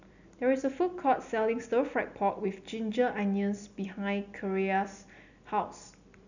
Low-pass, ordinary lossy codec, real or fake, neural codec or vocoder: 7.2 kHz; none; real; none